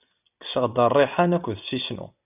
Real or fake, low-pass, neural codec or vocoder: real; 3.6 kHz; none